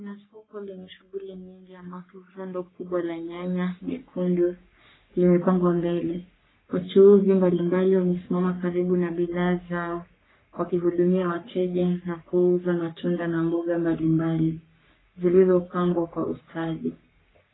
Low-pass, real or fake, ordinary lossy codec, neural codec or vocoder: 7.2 kHz; fake; AAC, 16 kbps; codec, 44.1 kHz, 3.4 kbps, Pupu-Codec